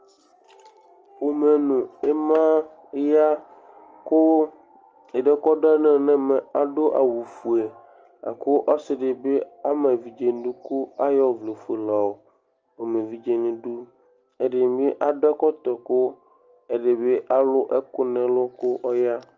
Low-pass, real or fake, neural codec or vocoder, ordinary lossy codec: 7.2 kHz; real; none; Opus, 24 kbps